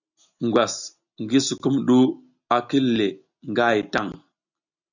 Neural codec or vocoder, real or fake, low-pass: none; real; 7.2 kHz